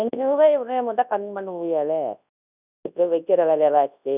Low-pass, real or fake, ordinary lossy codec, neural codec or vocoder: 3.6 kHz; fake; none; codec, 24 kHz, 0.9 kbps, WavTokenizer, large speech release